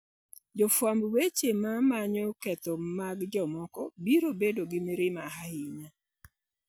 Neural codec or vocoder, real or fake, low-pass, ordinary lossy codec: none; real; none; none